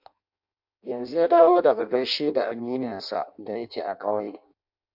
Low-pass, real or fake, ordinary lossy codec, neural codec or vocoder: 5.4 kHz; fake; MP3, 48 kbps; codec, 16 kHz in and 24 kHz out, 0.6 kbps, FireRedTTS-2 codec